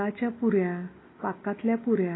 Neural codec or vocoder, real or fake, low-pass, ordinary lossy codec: none; real; 7.2 kHz; AAC, 16 kbps